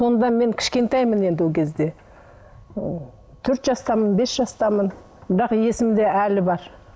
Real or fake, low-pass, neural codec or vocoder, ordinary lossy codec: real; none; none; none